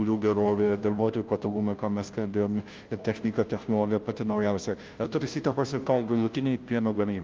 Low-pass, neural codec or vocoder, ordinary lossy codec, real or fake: 7.2 kHz; codec, 16 kHz, 0.5 kbps, FunCodec, trained on Chinese and English, 25 frames a second; Opus, 32 kbps; fake